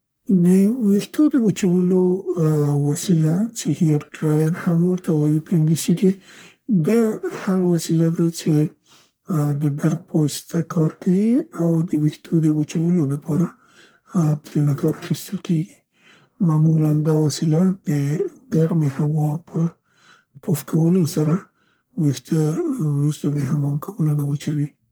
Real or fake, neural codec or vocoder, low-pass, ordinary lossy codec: fake; codec, 44.1 kHz, 1.7 kbps, Pupu-Codec; none; none